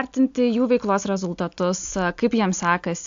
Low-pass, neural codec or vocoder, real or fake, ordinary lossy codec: 7.2 kHz; none; real; AAC, 64 kbps